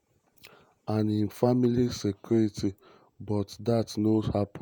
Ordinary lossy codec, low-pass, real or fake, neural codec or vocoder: none; none; fake; vocoder, 48 kHz, 128 mel bands, Vocos